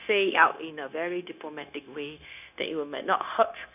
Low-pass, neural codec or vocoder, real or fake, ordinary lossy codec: 3.6 kHz; codec, 16 kHz, 0.9 kbps, LongCat-Audio-Codec; fake; none